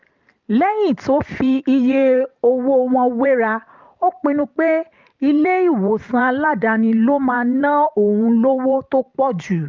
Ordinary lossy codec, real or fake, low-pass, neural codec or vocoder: Opus, 24 kbps; fake; 7.2 kHz; vocoder, 44.1 kHz, 128 mel bands every 512 samples, BigVGAN v2